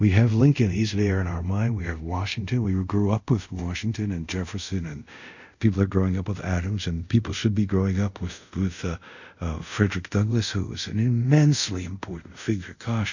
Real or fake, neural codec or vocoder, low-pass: fake; codec, 24 kHz, 0.5 kbps, DualCodec; 7.2 kHz